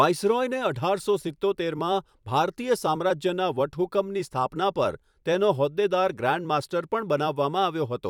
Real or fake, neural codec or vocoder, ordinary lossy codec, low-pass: fake; vocoder, 44.1 kHz, 128 mel bands every 256 samples, BigVGAN v2; none; 19.8 kHz